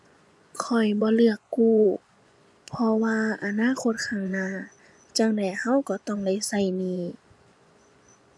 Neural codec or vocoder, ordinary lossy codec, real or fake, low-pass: vocoder, 24 kHz, 100 mel bands, Vocos; none; fake; none